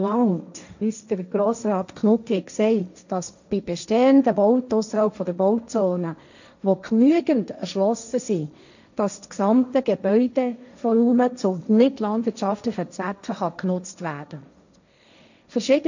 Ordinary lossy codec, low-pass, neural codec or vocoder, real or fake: none; 7.2 kHz; codec, 16 kHz, 1.1 kbps, Voila-Tokenizer; fake